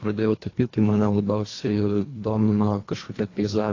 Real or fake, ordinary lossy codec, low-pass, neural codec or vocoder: fake; AAC, 32 kbps; 7.2 kHz; codec, 24 kHz, 1.5 kbps, HILCodec